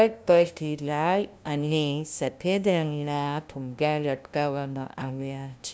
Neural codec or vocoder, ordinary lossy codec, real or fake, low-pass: codec, 16 kHz, 0.5 kbps, FunCodec, trained on LibriTTS, 25 frames a second; none; fake; none